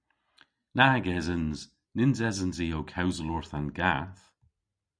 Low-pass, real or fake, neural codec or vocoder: 9.9 kHz; real; none